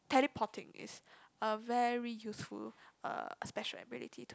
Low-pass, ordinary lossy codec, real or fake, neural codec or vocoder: none; none; real; none